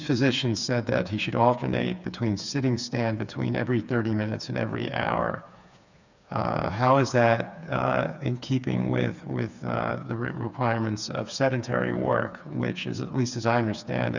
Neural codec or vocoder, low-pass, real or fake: codec, 16 kHz, 4 kbps, FreqCodec, smaller model; 7.2 kHz; fake